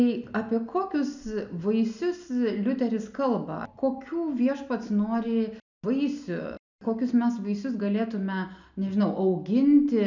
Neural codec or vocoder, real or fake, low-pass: none; real; 7.2 kHz